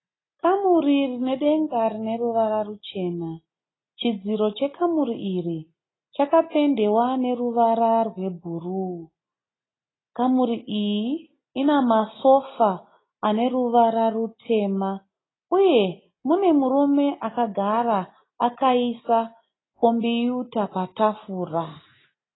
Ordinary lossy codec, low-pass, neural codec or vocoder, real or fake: AAC, 16 kbps; 7.2 kHz; none; real